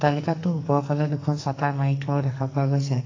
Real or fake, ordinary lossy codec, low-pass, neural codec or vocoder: fake; AAC, 32 kbps; 7.2 kHz; codec, 44.1 kHz, 2.6 kbps, SNAC